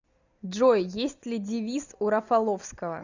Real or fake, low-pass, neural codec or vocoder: real; 7.2 kHz; none